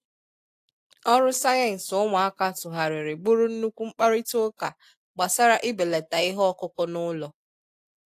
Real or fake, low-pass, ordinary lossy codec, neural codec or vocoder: real; 14.4 kHz; AAC, 64 kbps; none